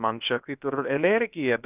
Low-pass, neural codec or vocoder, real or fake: 3.6 kHz; codec, 16 kHz, about 1 kbps, DyCAST, with the encoder's durations; fake